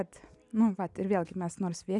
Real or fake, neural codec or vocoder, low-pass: real; none; 10.8 kHz